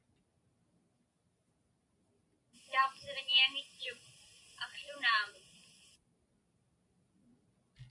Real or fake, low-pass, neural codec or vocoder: real; 10.8 kHz; none